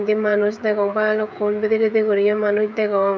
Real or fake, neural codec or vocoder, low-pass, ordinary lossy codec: fake; codec, 16 kHz, 16 kbps, FreqCodec, smaller model; none; none